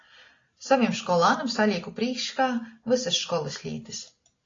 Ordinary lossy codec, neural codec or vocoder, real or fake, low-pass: AAC, 32 kbps; none; real; 7.2 kHz